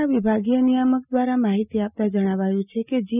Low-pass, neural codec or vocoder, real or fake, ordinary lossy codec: 3.6 kHz; none; real; none